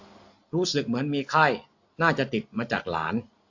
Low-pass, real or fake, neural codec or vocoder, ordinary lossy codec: 7.2 kHz; real; none; AAC, 48 kbps